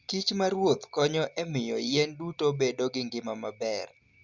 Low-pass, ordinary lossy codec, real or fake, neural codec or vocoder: 7.2 kHz; Opus, 64 kbps; real; none